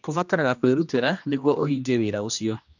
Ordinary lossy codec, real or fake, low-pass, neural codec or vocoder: none; fake; 7.2 kHz; codec, 16 kHz, 1 kbps, X-Codec, HuBERT features, trained on general audio